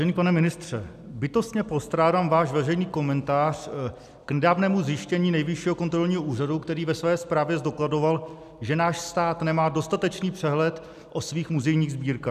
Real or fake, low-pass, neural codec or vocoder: real; 14.4 kHz; none